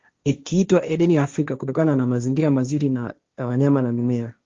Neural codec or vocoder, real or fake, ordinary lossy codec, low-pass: codec, 16 kHz, 1.1 kbps, Voila-Tokenizer; fake; Opus, 32 kbps; 7.2 kHz